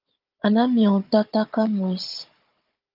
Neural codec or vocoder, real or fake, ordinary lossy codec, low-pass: codec, 16 kHz, 16 kbps, FunCodec, trained on Chinese and English, 50 frames a second; fake; Opus, 24 kbps; 5.4 kHz